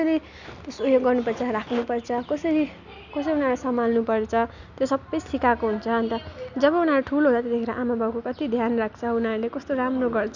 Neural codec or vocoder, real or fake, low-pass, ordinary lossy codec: none; real; 7.2 kHz; none